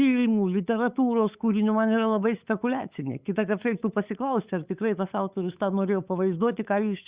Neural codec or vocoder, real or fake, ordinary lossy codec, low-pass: codec, 16 kHz, 8 kbps, FunCodec, trained on LibriTTS, 25 frames a second; fake; Opus, 64 kbps; 3.6 kHz